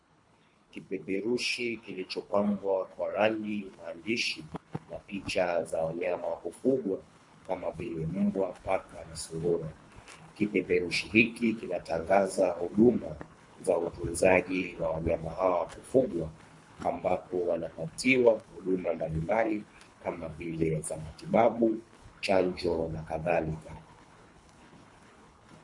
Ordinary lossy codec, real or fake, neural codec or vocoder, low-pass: MP3, 48 kbps; fake; codec, 24 kHz, 3 kbps, HILCodec; 10.8 kHz